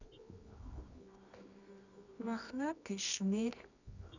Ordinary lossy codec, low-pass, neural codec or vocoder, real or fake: none; 7.2 kHz; codec, 24 kHz, 0.9 kbps, WavTokenizer, medium music audio release; fake